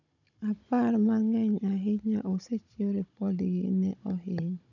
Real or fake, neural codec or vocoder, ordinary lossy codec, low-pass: fake; codec, 16 kHz, 16 kbps, FunCodec, trained on Chinese and English, 50 frames a second; none; 7.2 kHz